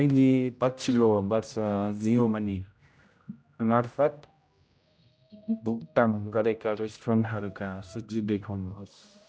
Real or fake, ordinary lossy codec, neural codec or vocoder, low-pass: fake; none; codec, 16 kHz, 0.5 kbps, X-Codec, HuBERT features, trained on general audio; none